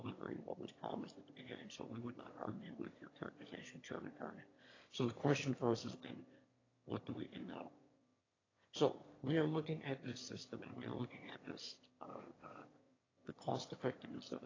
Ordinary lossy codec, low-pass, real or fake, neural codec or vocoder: AAC, 32 kbps; 7.2 kHz; fake; autoencoder, 22.05 kHz, a latent of 192 numbers a frame, VITS, trained on one speaker